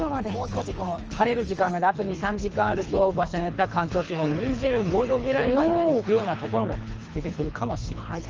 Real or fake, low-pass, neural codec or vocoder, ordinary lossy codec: fake; 7.2 kHz; codec, 24 kHz, 3 kbps, HILCodec; Opus, 24 kbps